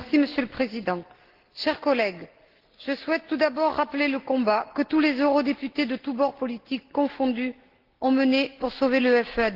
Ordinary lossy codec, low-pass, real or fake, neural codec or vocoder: Opus, 16 kbps; 5.4 kHz; real; none